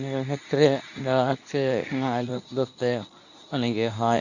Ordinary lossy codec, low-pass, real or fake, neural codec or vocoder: none; 7.2 kHz; fake; codec, 24 kHz, 0.9 kbps, WavTokenizer, medium speech release version 2